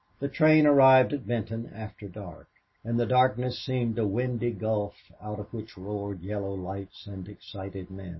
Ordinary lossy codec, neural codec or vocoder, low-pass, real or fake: MP3, 24 kbps; none; 7.2 kHz; real